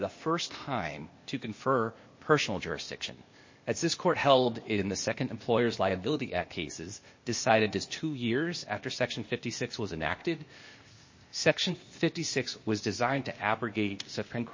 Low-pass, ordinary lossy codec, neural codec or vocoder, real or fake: 7.2 kHz; MP3, 32 kbps; codec, 16 kHz, 0.8 kbps, ZipCodec; fake